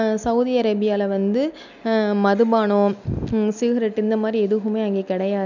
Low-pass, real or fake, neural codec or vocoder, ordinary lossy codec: 7.2 kHz; real; none; none